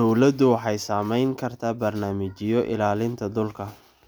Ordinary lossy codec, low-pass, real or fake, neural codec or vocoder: none; none; real; none